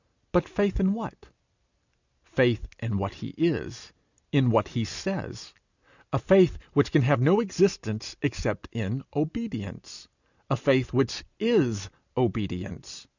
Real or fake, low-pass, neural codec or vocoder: real; 7.2 kHz; none